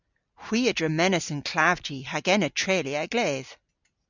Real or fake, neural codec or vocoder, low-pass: real; none; 7.2 kHz